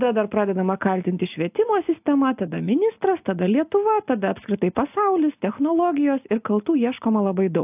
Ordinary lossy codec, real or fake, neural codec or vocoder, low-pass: AAC, 32 kbps; real; none; 3.6 kHz